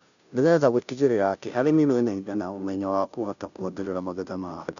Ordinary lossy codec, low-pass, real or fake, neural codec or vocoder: none; 7.2 kHz; fake; codec, 16 kHz, 0.5 kbps, FunCodec, trained on Chinese and English, 25 frames a second